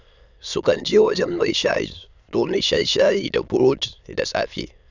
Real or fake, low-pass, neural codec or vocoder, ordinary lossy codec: fake; 7.2 kHz; autoencoder, 22.05 kHz, a latent of 192 numbers a frame, VITS, trained on many speakers; none